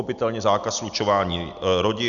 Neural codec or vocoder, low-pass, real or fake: none; 7.2 kHz; real